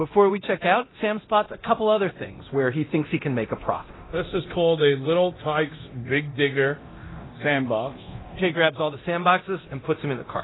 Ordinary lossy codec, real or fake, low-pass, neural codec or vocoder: AAC, 16 kbps; fake; 7.2 kHz; codec, 24 kHz, 0.9 kbps, DualCodec